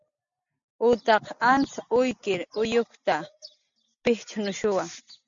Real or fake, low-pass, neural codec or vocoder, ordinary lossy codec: real; 7.2 kHz; none; MP3, 64 kbps